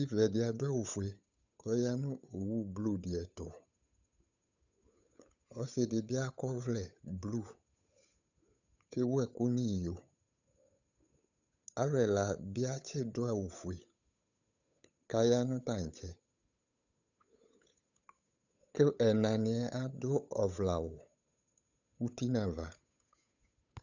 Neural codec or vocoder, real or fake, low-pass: codec, 16 kHz, 8 kbps, FunCodec, trained on Chinese and English, 25 frames a second; fake; 7.2 kHz